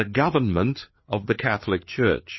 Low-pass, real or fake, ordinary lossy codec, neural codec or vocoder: 7.2 kHz; fake; MP3, 24 kbps; codec, 16 kHz, 4 kbps, FreqCodec, larger model